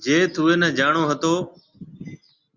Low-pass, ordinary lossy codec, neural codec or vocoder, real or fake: 7.2 kHz; Opus, 64 kbps; none; real